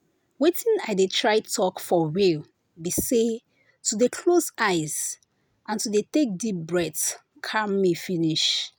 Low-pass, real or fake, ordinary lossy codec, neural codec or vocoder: none; real; none; none